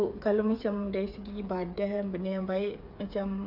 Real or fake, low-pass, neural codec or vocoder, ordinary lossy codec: fake; 5.4 kHz; codec, 16 kHz, 8 kbps, FreqCodec, smaller model; AAC, 48 kbps